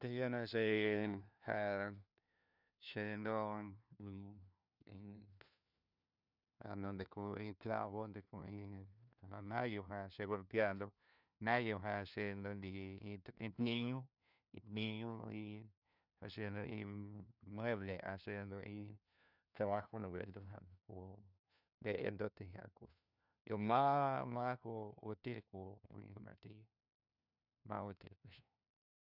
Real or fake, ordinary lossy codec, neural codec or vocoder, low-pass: fake; none; codec, 16 kHz, 1 kbps, FunCodec, trained on LibriTTS, 50 frames a second; 5.4 kHz